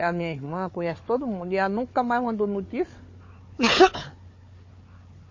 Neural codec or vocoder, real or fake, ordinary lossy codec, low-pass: codec, 16 kHz, 4 kbps, FunCodec, trained on Chinese and English, 50 frames a second; fake; MP3, 32 kbps; 7.2 kHz